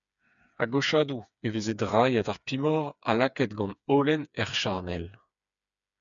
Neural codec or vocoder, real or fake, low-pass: codec, 16 kHz, 4 kbps, FreqCodec, smaller model; fake; 7.2 kHz